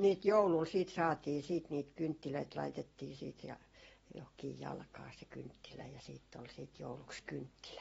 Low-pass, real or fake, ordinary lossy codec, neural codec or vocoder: 19.8 kHz; fake; AAC, 24 kbps; vocoder, 44.1 kHz, 128 mel bands every 256 samples, BigVGAN v2